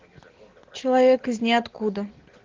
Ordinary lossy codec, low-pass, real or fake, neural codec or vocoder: Opus, 16 kbps; 7.2 kHz; real; none